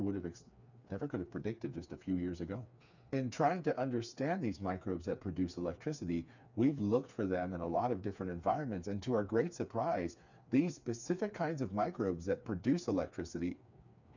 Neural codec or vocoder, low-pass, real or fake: codec, 16 kHz, 4 kbps, FreqCodec, smaller model; 7.2 kHz; fake